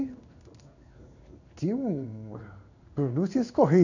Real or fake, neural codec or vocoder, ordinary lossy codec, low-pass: fake; codec, 16 kHz in and 24 kHz out, 1 kbps, XY-Tokenizer; none; 7.2 kHz